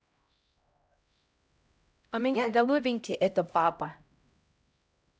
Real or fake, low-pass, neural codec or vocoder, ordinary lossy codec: fake; none; codec, 16 kHz, 0.5 kbps, X-Codec, HuBERT features, trained on LibriSpeech; none